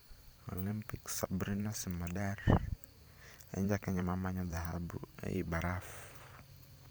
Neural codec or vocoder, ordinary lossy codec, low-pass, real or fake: vocoder, 44.1 kHz, 128 mel bands every 512 samples, BigVGAN v2; none; none; fake